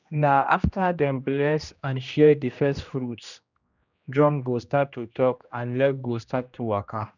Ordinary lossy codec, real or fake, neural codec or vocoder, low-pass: none; fake; codec, 16 kHz, 1 kbps, X-Codec, HuBERT features, trained on general audio; 7.2 kHz